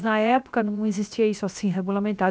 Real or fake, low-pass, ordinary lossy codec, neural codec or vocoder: fake; none; none; codec, 16 kHz, 0.7 kbps, FocalCodec